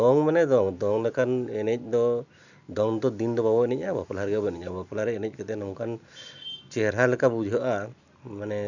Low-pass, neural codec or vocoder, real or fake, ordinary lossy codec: 7.2 kHz; none; real; none